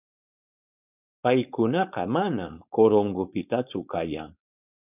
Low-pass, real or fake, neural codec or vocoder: 3.6 kHz; fake; codec, 16 kHz, 4.8 kbps, FACodec